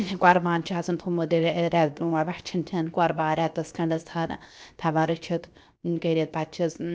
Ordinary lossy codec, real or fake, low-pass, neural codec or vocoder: none; fake; none; codec, 16 kHz, 0.7 kbps, FocalCodec